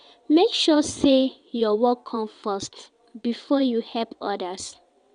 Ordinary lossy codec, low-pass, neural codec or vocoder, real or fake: none; 9.9 kHz; vocoder, 22.05 kHz, 80 mel bands, WaveNeXt; fake